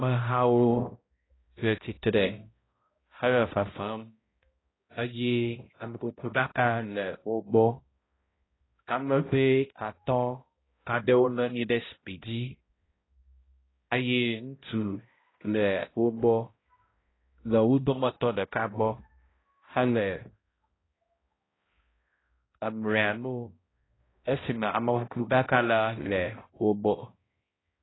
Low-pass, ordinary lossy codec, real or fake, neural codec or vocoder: 7.2 kHz; AAC, 16 kbps; fake; codec, 16 kHz, 0.5 kbps, X-Codec, HuBERT features, trained on balanced general audio